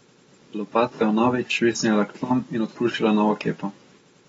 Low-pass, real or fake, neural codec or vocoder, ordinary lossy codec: 19.8 kHz; real; none; AAC, 24 kbps